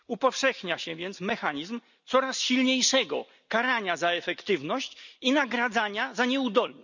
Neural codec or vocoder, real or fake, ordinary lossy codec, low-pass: none; real; none; 7.2 kHz